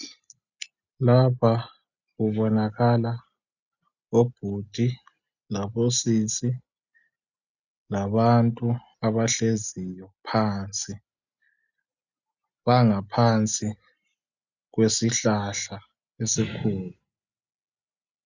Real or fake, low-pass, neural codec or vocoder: real; 7.2 kHz; none